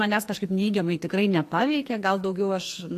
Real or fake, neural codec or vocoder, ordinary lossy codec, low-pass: fake; codec, 44.1 kHz, 2.6 kbps, SNAC; AAC, 64 kbps; 14.4 kHz